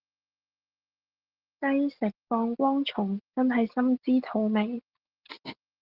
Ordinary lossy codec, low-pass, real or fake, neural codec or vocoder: Opus, 16 kbps; 5.4 kHz; real; none